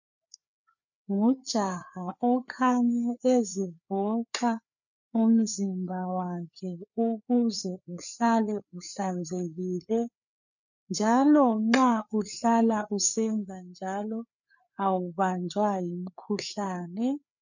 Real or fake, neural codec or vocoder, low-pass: fake; codec, 16 kHz, 4 kbps, FreqCodec, larger model; 7.2 kHz